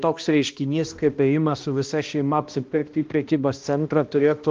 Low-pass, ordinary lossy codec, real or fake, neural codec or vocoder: 7.2 kHz; Opus, 32 kbps; fake; codec, 16 kHz, 1 kbps, X-Codec, HuBERT features, trained on balanced general audio